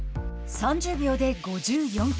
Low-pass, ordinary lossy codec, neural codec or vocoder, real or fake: none; none; none; real